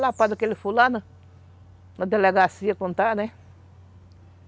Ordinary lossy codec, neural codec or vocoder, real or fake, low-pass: none; none; real; none